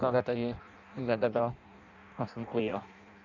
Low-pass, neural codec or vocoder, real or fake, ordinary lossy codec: 7.2 kHz; codec, 16 kHz in and 24 kHz out, 0.6 kbps, FireRedTTS-2 codec; fake; none